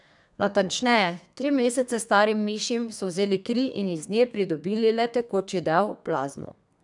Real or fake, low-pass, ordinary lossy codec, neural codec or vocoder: fake; 10.8 kHz; none; codec, 32 kHz, 1.9 kbps, SNAC